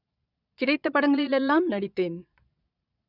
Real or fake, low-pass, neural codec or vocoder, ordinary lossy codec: fake; 5.4 kHz; vocoder, 22.05 kHz, 80 mel bands, Vocos; none